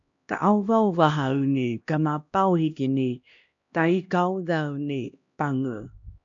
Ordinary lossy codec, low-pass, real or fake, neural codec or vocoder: AAC, 64 kbps; 7.2 kHz; fake; codec, 16 kHz, 1 kbps, X-Codec, HuBERT features, trained on LibriSpeech